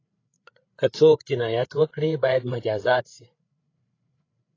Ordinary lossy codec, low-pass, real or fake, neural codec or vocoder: AAC, 32 kbps; 7.2 kHz; fake; codec, 16 kHz, 8 kbps, FreqCodec, larger model